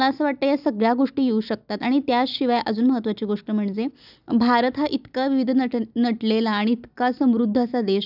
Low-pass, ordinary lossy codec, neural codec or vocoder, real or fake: 5.4 kHz; none; none; real